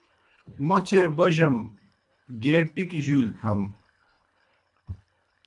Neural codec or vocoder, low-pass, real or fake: codec, 24 kHz, 1.5 kbps, HILCodec; 10.8 kHz; fake